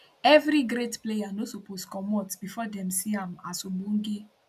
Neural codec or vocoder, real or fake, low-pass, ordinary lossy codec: none; real; 14.4 kHz; none